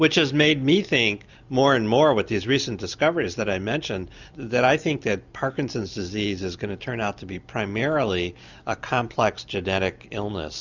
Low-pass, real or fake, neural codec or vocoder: 7.2 kHz; real; none